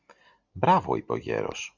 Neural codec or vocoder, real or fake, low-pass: none; real; 7.2 kHz